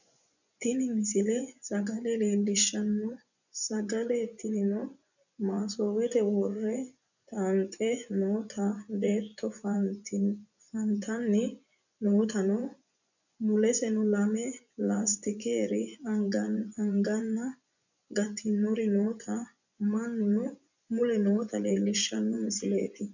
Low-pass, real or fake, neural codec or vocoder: 7.2 kHz; fake; vocoder, 24 kHz, 100 mel bands, Vocos